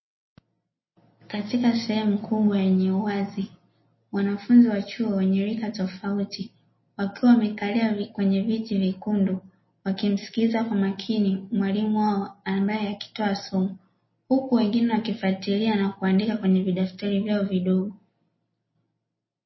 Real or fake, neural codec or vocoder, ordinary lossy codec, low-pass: real; none; MP3, 24 kbps; 7.2 kHz